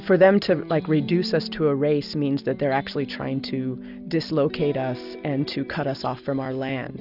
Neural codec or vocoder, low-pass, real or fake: none; 5.4 kHz; real